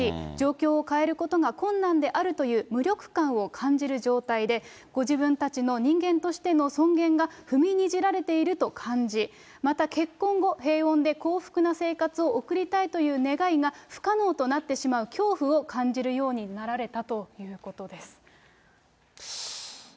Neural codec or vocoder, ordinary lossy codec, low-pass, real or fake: none; none; none; real